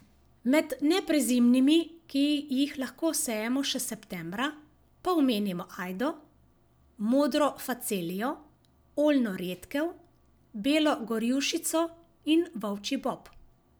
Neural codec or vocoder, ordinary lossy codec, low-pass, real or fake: none; none; none; real